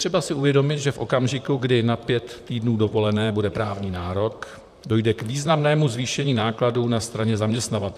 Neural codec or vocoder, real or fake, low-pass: vocoder, 44.1 kHz, 128 mel bands, Pupu-Vocoder; fake; 14.4 kHz